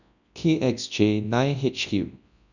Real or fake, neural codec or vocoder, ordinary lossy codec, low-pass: fake; codec, 24 kHz, 0.9 kbps, WavTokenizer, large speech release; none; 7.2 kHz